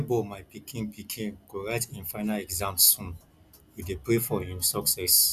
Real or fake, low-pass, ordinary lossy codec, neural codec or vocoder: real; 14.4 kHz; none; none